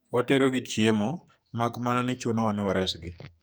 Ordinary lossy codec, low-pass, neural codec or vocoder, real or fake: none; none; codec, 44.1 kHz, 2.6 kbps, SNAC; fake